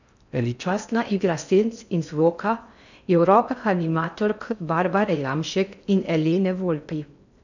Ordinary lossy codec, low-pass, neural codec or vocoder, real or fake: none; 7.2 kHz; codec, 16 kHz in and 24 kHz out, 0.6 kbps, FocalCodec, streaming, 4096 codes; fake